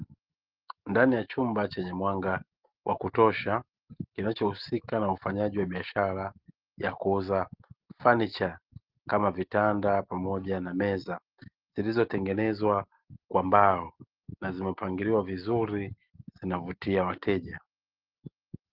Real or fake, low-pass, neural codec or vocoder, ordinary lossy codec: real; 5.4 kHz; none; Opus, 16 kbps